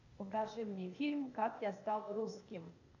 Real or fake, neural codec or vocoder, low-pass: fake; codec, 16 kHz, 0.8 kbps, ZipCodec; 7.2 kHz